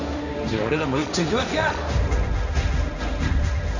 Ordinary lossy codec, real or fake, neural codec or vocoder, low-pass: none; fake; codec, 16 kHz, 1.1 kbps, Voila-Tokenizer; 7.2 kHz